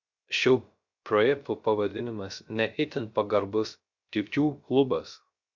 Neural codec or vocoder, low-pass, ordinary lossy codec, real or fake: codec, 16 kHz, 0.3 kbps, FocalCodec; 7.2 kHz; Opus, 64 kbps; fake